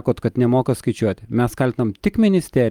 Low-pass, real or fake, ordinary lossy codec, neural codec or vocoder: 19.8 kHz; real; Opus, 32 kbps; none